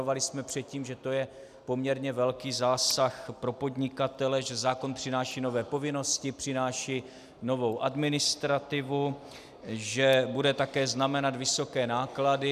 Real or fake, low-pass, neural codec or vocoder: real; 14.4 kHz; none